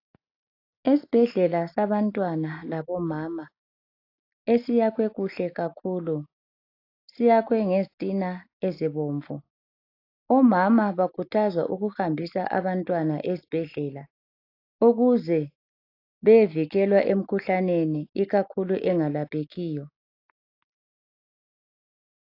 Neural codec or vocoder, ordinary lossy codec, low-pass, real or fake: none; AAC, 32 kbps; 5.4 kHz; real